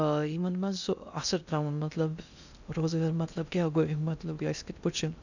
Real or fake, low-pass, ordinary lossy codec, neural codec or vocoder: fake; 7.2 kHz; none; codec, 16 kHz in and 24 kHz out, 0.6 kbps, FocalCodec, streaming, 2048 codes